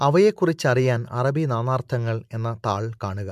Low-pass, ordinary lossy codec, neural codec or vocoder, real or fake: 14.4 kHz; MP3, 96 kbps; none; real